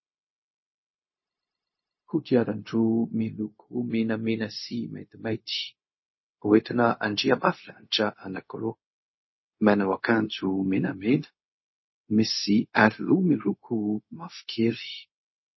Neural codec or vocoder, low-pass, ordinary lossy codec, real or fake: codec, 16 kHz, 0.4 kbps, LongCat-Audio-Codec; 7.2 kHz; MP3, 24 kbps; fake